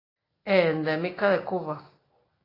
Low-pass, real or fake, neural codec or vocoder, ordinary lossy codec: 5.4 kHz; real; none; AAC, 24 kbps